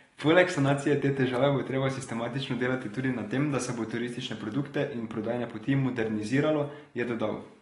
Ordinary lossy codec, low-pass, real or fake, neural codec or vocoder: AAC, 32 kbps; 10.8 kHz; real; none